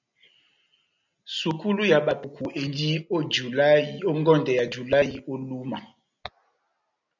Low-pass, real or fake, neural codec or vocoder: 7.2 kHz; real; none